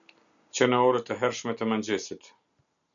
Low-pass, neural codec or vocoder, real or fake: 7.2 kHz; none; real